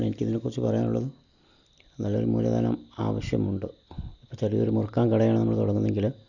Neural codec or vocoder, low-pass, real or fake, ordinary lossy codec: none; 7.2 kHz; real; none